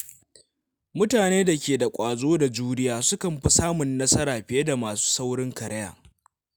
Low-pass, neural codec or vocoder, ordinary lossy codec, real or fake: none; none; none; real